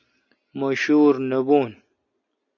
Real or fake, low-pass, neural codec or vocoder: real; 7.2 kHz; none